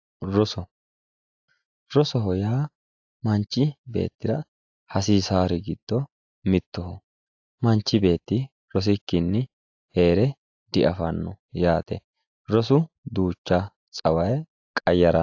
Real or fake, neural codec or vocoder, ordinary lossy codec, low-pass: real; none; AAC, 48 kbps; 7.2 kHz